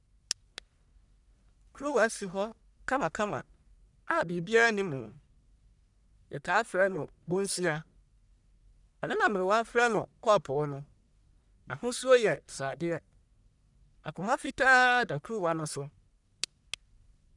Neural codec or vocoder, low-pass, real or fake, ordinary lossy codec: codec, 44.1 kHz, 1.7 kbps, Pupu-Codec; 10.8 kHz; fake; none